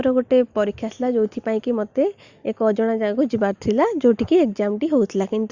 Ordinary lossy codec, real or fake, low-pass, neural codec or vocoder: Opus, 64 kbps; real; 7.2 kHz; none